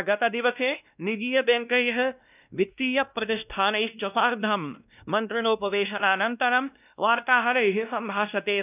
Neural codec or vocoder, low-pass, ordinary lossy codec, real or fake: codec, 16 kHz, 1 kbps, X-Codec, WavLM features, trained on Multilingual LibriSpeech; 3.6 kHz; none; fake